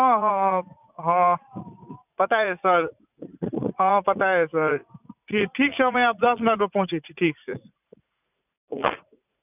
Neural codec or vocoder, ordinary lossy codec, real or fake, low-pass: vocoder, 44.1 kHz, 80 mel bands, Vocos; none; fake; 3.6 kHz